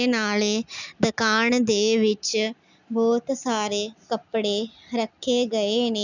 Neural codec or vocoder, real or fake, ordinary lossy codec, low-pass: none; real; none; 7.2 kHz